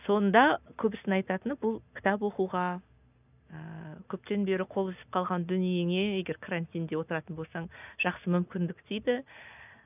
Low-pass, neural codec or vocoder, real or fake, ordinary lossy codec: 3.6 kHz; none; real; none